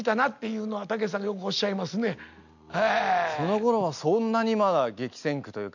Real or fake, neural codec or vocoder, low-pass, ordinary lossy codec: real; none; 7.2 kHz; none